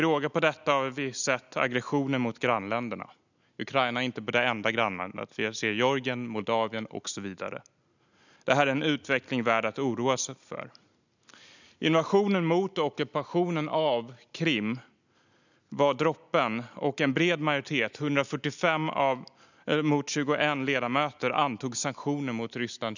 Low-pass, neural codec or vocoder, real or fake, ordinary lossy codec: 7.2 kHz; none; real; none